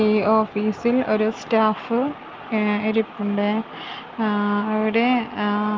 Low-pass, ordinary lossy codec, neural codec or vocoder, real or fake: 7.2 kHz; Opus, 24 kbps; none; real